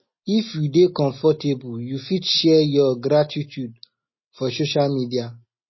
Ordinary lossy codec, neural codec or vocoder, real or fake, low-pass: MP3, 24 kbps; none; real; 7.2 kHz